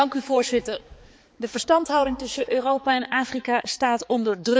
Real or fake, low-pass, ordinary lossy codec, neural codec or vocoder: fake; none; none; codec, 16 kHz, 4 kbps, X-Codec, HuBERT features, trained on balanced general audio